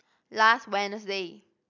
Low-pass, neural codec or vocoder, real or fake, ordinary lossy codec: 7.2 kHz; none; real; none